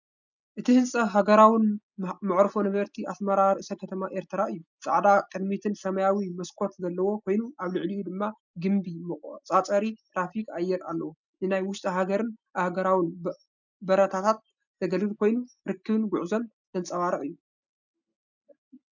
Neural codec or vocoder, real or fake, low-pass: none; real; 7.2 kHz